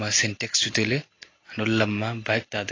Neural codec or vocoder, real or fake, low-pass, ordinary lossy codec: none; real; 7.2 kHz; AAC, 32 kbps